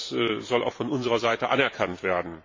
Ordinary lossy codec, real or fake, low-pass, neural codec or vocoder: MP3, 32 kbps; fake; 7.2 kHz; vocoder, 44.1 kHz, 128 mel bands every 512 samples, BigVGAN v2